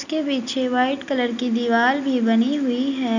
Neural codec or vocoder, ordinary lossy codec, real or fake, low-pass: none; none; real; 7.2 kHz